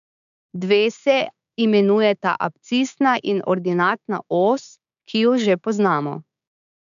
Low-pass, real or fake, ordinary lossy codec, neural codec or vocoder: 7.2 kHz; fake; none; codec, 16 kHz, 6 kbps, DAC